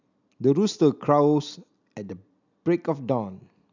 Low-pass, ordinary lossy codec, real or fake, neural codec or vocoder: 7.2 kHz; none; real; none